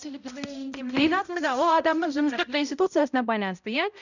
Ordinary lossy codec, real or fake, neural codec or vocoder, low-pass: none; fake; codec, 16 kHz, 0.5 kbps, X-Codec, HuBERT features, trained on balanced general audio; 7.2 kHz